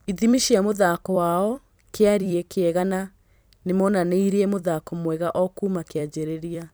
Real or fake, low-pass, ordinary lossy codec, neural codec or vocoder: fake; none; none; vocoder, 44.1 kHz, 128 mel bands every 256 samples, BigVGAN v2